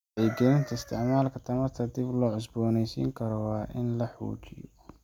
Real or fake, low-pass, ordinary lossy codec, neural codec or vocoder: real; 19.8 kHz; none; none